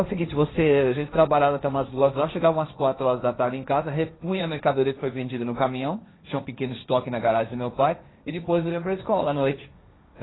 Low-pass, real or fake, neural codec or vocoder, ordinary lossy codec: 7.2 kHz; fake; codec, 16 kHz, 1.1 kbps, Voila-Tokenizer; AAC, 16 kbps